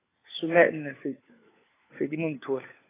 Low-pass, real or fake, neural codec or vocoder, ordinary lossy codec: 3.6 kHz; fake; codec, 16 kHz, 4 kbps, FunCodec, trained on LibriTTS, 50 frames a second; AAC, 16 kbps